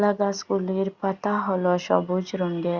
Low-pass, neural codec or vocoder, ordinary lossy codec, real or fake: 7.2 kHz; none; Opus, 64 kbps; real